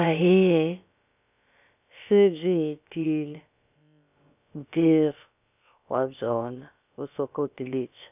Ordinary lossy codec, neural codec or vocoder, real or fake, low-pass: none; codec, 16 kHz, about 1 kbps, DyCAST, with the encoder's durations; fake; 3.6 kHz